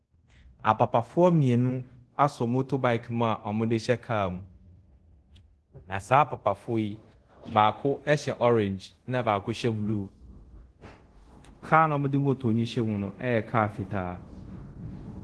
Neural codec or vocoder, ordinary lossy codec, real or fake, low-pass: codec, 24 kHz, 0.5 kbps, DualCodec; Opus, 16 kbps; fake; 10.8 kHz